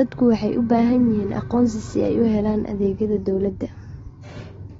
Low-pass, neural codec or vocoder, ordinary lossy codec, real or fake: 7.2 kHz; none; AAC, 24 kbps; real